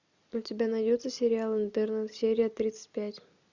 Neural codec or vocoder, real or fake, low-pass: none; real; 7.2 kHz